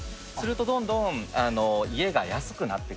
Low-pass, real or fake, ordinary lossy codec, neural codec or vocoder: none; real; none; none